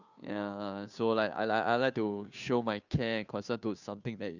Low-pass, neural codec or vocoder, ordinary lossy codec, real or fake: 7.2 kHz; codec, 16 kHz, 2 kbps, FunCodec, trained on Chinese and English, 25 frames a second; none; fake